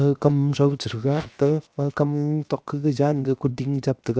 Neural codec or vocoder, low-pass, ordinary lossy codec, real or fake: codec, 16 kHz, 0.7 kbps, FocalCodec; none; none; fake